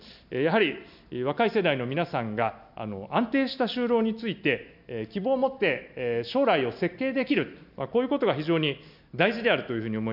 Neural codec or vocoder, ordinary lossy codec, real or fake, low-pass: none; none; real; 5.4 kHz